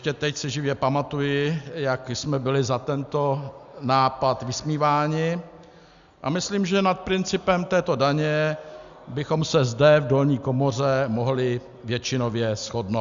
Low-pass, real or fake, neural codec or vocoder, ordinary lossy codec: 7.2 kHz; real; none; Opus, 64 kbps